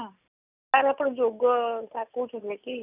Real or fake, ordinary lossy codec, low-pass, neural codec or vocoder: real; none; 3.6 kHz; none